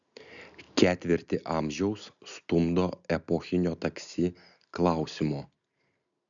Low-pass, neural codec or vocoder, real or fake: 7.2 kHz; none; real